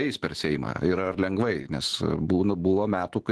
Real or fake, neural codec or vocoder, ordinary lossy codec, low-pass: fake; autoencoder, 48 kHz, 128 numbers a frame, DAC-VAE, trained on Japanese speech; Opus, 16 kbps; 10.8 kHz